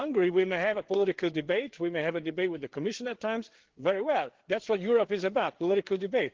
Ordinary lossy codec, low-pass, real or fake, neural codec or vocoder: Opus, 16 kbps; 7.2 kHz; fake; codec, 16 kHz, 4 kbps, FreqCodec, larger model